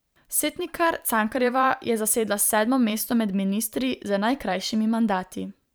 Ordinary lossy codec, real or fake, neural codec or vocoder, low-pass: none; fake; vocoder, 44.1 kHz, 128 mel bands every 512 samples, BigVGAN v2; none